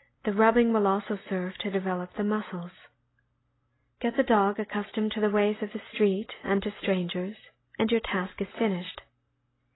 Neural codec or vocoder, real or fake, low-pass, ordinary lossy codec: none; real; 7.2 kHz; AAC, 16 kbps